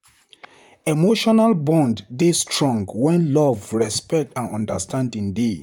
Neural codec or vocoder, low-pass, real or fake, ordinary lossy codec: vocoder, 44.1 kHz, 128 mel bands, Pupu-Vocoder; 19.8 kHz; fake; none